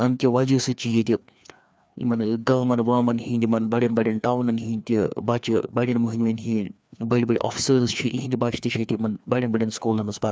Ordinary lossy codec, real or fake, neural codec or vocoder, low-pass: none; fake; codec, 16 kHz, 2 kbps, FreqCodec, larger model; none